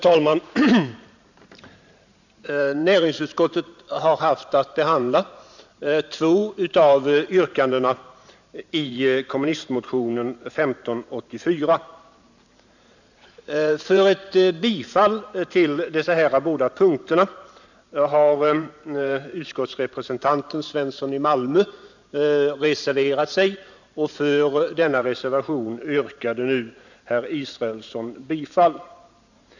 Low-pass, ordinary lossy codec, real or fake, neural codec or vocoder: 7.2 kHz; none; fake; vocoder, 44.1 kHz, 128 mel bands every 512 samples, BigVGAN v2